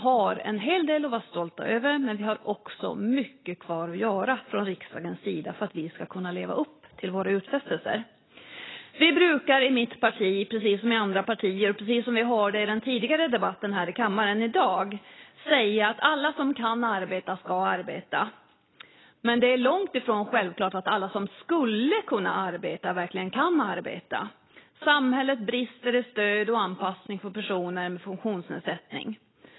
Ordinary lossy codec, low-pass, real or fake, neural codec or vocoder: AAC, 16 kbps; 7.2 kHz; real; none